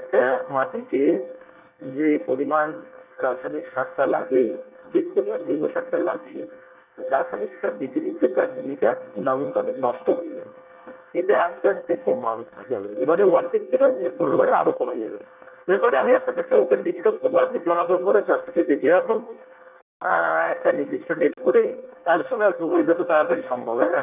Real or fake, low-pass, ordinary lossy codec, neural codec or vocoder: fake; 3.6 kHz; none; codec, 24 kHz, 1 kbps, SNAC